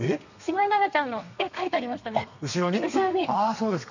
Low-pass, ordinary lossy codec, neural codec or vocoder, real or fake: 7.2 kHz; none; codec, 32 kHz, 1.9 kbps, SNAC; fake